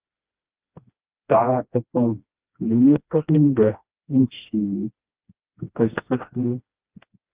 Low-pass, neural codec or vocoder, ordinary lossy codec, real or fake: 3.6 kHz; codec, 16 kHz, 1 kbps, FreqCodec, smaller model; Opus, 24 kbps; fake